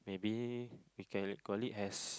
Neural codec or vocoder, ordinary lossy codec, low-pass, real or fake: none; none; none; real